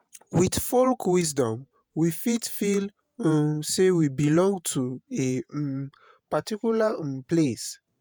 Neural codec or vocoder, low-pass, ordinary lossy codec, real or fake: vocoder, 48 kHz, 128 mel bands, Vocos; none; none; fake